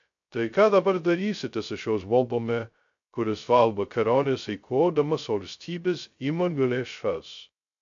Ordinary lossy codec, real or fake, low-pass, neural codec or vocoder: AAC, 64 kbps; fake; 7.2 kHz; codec, 16 kHz, 0.2 kbps, FocalCodec